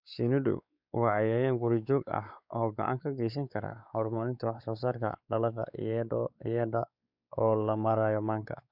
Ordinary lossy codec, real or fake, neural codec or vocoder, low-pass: none; fake; codec, 16 kHz, 4 kbps, X-Codec, WavLM features, trained on Multilingual LibriSpeech; 5.4 kHz